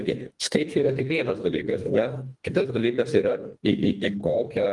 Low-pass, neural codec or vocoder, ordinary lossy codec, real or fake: 10.8 kHz; codec, 24 kHz, 1.5 kbps, HILCodec; Opus, 24 kbps; fake